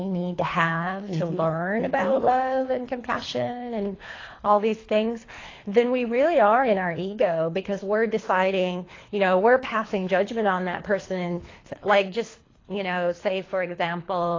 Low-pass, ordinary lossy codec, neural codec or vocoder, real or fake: 7.2 kHz; AAC, 32 kbps; codec, 24 kHz, 3 kbps, HILCodec; fake